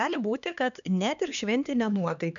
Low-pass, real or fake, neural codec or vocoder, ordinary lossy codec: 7.2 kHz; fake; codec, 16 kHz, 2 kbps, X-Codec, HuBERT features, trained on LibriSpeech; MP3, 96 kbps